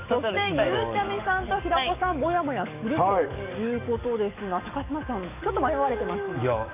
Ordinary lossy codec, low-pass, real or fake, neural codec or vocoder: none; 3.6 kHz; fake; codec, 44.1 kHz, 7.8 kbps, DAC